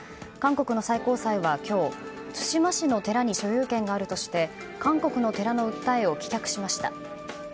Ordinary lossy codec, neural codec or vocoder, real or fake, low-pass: none; none; real; none